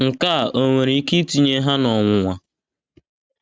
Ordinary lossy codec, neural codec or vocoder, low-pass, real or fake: none; none; none; real